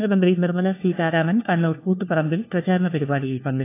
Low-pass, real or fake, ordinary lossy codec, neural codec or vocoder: 3.6 kHz; fake; AAC, 24 kbps; codec, 16 kHz, 1 kbps, FunCodec, trained on LibriTTS, 50 frames a second